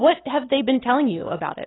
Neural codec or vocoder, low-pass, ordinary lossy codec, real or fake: none; 7.2 kHz; AAC, 16 kbps; real